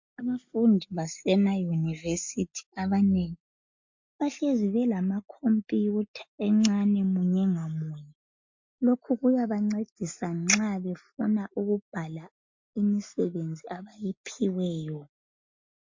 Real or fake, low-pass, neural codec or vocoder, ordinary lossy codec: real; 7.2 kHz; none; MP3, 48 kbps